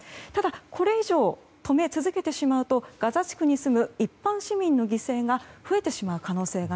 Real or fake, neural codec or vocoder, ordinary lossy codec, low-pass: real; none; none; none